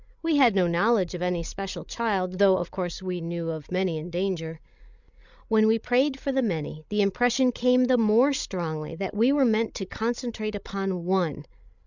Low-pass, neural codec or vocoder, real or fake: 7.2 kHz; codec, 16 kHz, 16 kbps, FreqCodec, larger model; fake